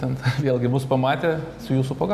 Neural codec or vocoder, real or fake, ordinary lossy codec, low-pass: none; real; MP3, 96 kbps; 14.4 kHz